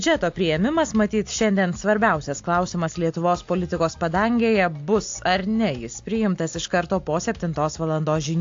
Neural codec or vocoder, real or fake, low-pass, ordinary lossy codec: none; real; 7.2 kHz; AAC, 48 kbps